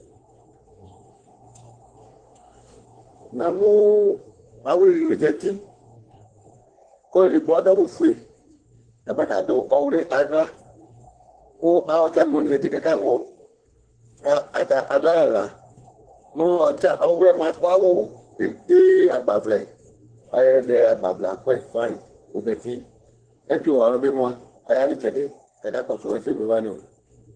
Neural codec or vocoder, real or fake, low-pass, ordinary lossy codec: codec, 24 kHz, 1 kbps, SNAC; fake; 9.9 kHz; Opus, 16 kbps